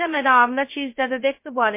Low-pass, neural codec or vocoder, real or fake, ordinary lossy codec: 3.6 kHz; codec, 16 kHz, 0.2 kbps, FocalCodec; fake; MP3, 24 kbps